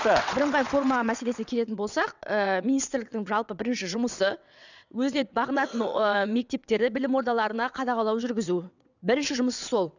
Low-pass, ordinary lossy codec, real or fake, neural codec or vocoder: 7.2 kHz; none; fake; vocoder, 22.05 kHz, 80 mel bands, WaveNeXt